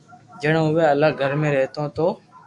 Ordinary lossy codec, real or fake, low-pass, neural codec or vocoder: Opus, 64 kbps; fake; 10.8 kHz; autoencoder, 48 kHz, 128 numbers a frame, DAC-VAE, trained on Japanese speech